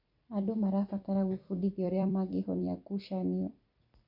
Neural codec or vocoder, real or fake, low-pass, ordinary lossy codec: vocoder, 44.1 kHz, 128 mel bands every 256 samples, BigVGAN v2; fake; 5.4 kHz; none